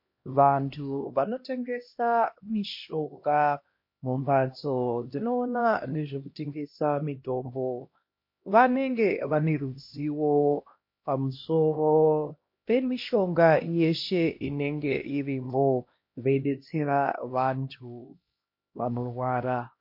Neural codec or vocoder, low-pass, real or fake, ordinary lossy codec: codec, 16 kHz, 1 kbps, X-Codec, HuBERT features, trained on LibriSpeech; 5.4 kHz; fake; MP3, 32 kbps